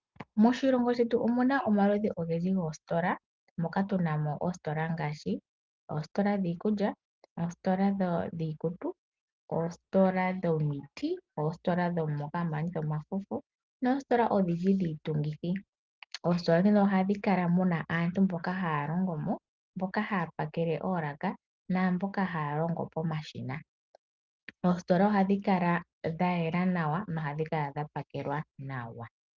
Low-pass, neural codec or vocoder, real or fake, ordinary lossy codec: 7.2 kHz; none; real; Opus, 32 kbps